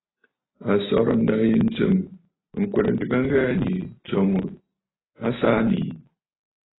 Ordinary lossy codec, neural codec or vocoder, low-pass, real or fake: AAC, 16 kbps; none; 7.2 kHz; real